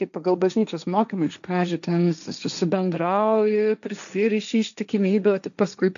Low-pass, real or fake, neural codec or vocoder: 7.2 kHz; fake; codec, 16 kHz, 1.1 kbps, Voila-Tokenizer